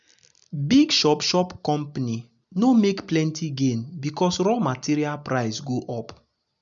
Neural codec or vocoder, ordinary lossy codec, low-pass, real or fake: none; MP3, 96 kbps; 7.2 kHz; real